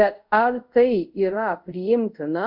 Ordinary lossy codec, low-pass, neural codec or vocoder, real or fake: MP3, 48 kbps; 5.4 kHz; codec, 24 kHz, 0.5 kbps, DualCodec; fake